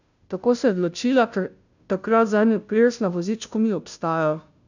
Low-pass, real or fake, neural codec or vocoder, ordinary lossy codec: 7.2 kHz; fake; codec, 16 kHz, 0.5 kbps, FunCodec, trained on Chinese and English, 25 frames a second; none